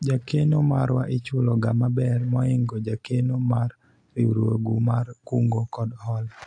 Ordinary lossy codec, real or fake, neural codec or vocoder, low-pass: AAC, 48 kbps; real; none; 9.9 kHz